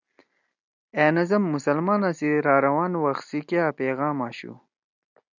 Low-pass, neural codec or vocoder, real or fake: 7.2 kHz; none; real